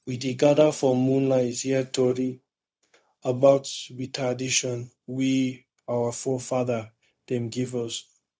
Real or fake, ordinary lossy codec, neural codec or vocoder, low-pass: fake; none; codec, 16 kHz, 0.4 kbps, LongCat-Audio-Codec; none